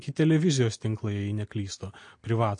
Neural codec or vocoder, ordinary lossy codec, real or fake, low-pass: none; MP3, 48 kbps; real; 9.9 kHz